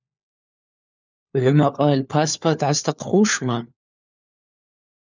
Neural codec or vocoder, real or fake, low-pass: codec, 16 kHz, 4 kbps, FunCodec, trained on LibriTTS, 50 frames a second; fake; 7.2 kHz